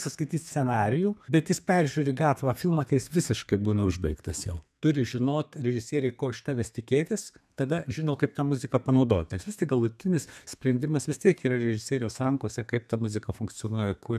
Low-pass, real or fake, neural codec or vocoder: 14.4 kHz; fake; codec, 32 kHz, 1.9 kbps, SNAC